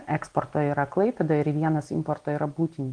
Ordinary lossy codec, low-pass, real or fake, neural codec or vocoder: Opus, 24 kbps; 9.9 kHz; real; none